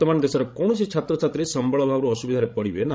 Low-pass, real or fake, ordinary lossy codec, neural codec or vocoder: none; fake; none; codec, 16 kHz, 16 kbps, FreqCodec, larger model